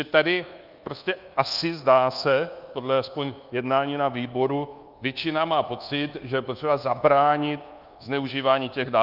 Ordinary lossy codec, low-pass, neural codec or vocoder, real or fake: Opus, 24 kbps; 5.4 kHz; codec, 24 kHz, 1.2 kbps, DualCodec; fake